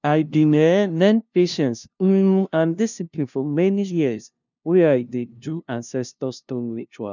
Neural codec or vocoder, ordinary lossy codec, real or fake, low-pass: codec, 16 kHz, 0.5 kbps, FunCodec, trained on LibriTTS, 25 frames a second; none; fake; 7.2 kHz